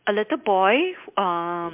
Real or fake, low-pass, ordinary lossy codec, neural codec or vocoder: real; 3.6 kHz; MP3, 32 kbps; none